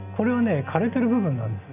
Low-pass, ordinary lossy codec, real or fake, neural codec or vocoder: 3.6 kHz; none; real; none